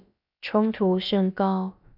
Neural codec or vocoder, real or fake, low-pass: codec, 16 kHz, about 1 kbps, DyCAST, with the encoder's durations; fake; 5.4 kHz